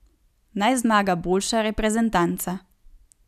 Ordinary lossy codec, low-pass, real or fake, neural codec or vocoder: none; 14.4 kHz; real; none